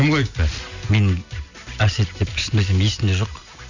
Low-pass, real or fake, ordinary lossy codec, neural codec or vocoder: 7.2 kHz; real; none; none